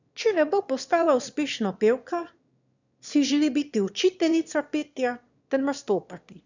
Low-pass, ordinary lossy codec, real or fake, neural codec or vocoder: 7.2 kHz; none; fake; autoencoder, 22.05 kHz, a latent of 192 numbers a frame, VITS, trained on one speaker